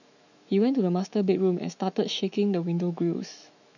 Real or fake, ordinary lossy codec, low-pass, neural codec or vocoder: fake; none; 7.2 kHz; autoencoder, 48 kHz, 128 numbers a frame, DAC-VAE, trained on Japanese speech